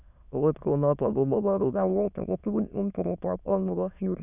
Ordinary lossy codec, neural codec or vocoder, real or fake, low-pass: none; autoencoder, 22.05 kHz, a latent of 192 numbers a frame, VITS, trained on many speakers; fake; 3.6 kHz